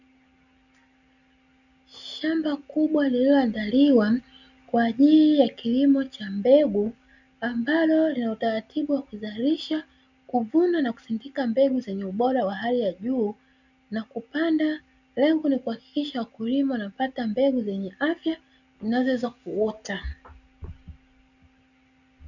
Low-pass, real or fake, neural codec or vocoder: 7.2 kHz; real; none